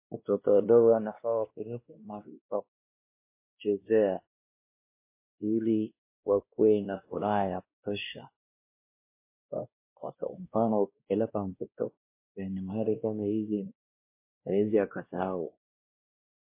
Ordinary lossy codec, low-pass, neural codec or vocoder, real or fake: MP3, 24 kbps; 3.6 kHz; codec, 16 kHz, 1 kbps, X-Codec, WavLM features, trained on Multilingual LibriSpeech; fake